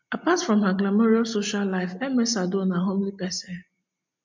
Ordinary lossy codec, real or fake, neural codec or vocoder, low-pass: MP3, 64 kbps; real; none; 7.2 kHz